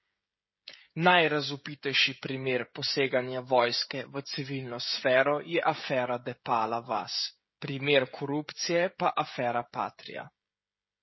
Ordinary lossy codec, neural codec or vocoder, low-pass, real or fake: MP3, 24 kbps; codec, 16 kHz, 16 kbps, FreqCodec, smaller model; 7.2 kHz; fake